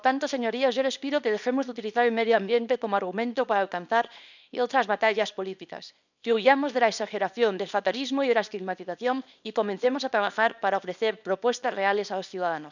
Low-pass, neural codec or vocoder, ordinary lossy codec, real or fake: 7.2 kHz; codec, 24 kHz, 0.9 kbps, WavTokenizer, small release; none; fake